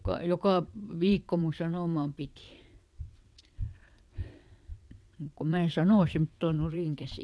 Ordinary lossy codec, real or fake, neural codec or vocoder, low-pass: none; fake; vocoder, 22.05 kHz, 80 mel bands, WaveNeXt; none